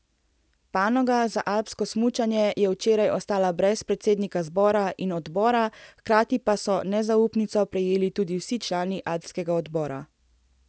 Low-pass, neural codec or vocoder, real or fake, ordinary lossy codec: none; none; real; none